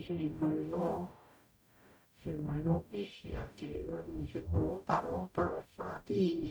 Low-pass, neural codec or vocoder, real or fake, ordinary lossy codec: none; codec, 44.1 kHz, 0.9 kbps, DAC; fake; none